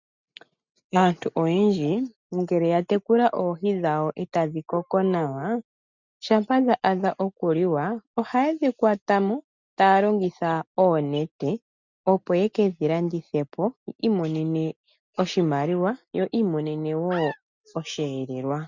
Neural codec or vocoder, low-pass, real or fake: none; 7.2 kHz; real